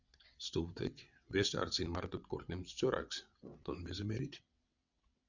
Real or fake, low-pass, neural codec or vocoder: fake; 7.2 kHz; vocoder, 22.05 kHz, 80 mel bands, WaveNeXt